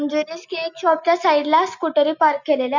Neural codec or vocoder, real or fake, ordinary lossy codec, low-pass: none; real; none; 7.2 kHz